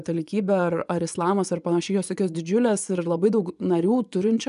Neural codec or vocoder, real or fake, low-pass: vocoder, 24 kHz, 100 mel bands, Vocos; fake; 10.8 kHz